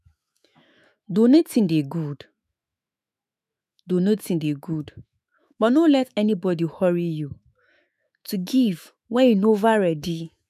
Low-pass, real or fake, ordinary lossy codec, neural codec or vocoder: 14.4 kHz; fake; none; autoencoder, 48 kHz, 128 numbers a frame, DAC-VAE, trained on Japanese speech